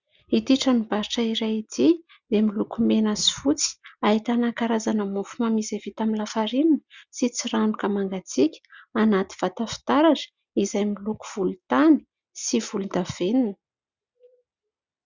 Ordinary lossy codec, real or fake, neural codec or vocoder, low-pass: Opus, 64 kbps; real; none; 7.2 kHz